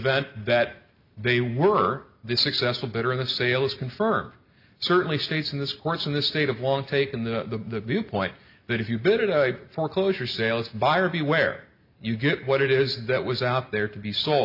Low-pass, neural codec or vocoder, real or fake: 5.4 kHz; none; real